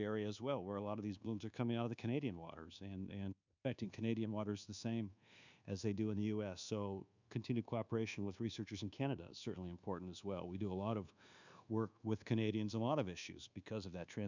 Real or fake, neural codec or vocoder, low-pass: fake; codec, 24 kHz, 1.2 kbps, DualCodec; 7.2 kHz